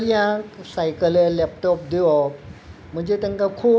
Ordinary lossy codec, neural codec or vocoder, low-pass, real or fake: none; none; none; real